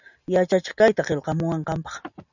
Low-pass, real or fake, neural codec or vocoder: 7.2 kHz; real; none